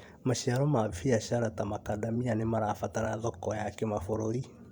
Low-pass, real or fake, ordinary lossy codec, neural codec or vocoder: 19.8 kHz; fake; Opus, 64 kbps; vocoder, 44.1 kHz, 128 mel bands every 512 samples, BigVGAN v2